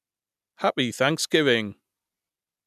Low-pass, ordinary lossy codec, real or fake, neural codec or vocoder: 14.4 kHz; none; real; none